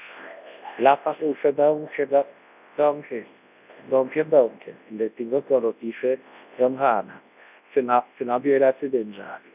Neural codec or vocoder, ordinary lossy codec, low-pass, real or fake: codec, 24 kHz, 0.9 kbps, WavTokenizer, large speech release; none; 3.6 kHz; fake